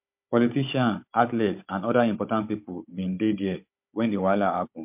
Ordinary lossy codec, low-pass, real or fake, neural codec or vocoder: MP3, 32 kbps; 3.6 kHz; fake; codec, 16 kHz, 16 kbps, FunCodec, trained on Chinese and English, 50 frames a second